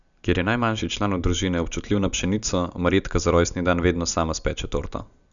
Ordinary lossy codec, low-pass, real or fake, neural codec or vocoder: none; 7.2 kHz; real; none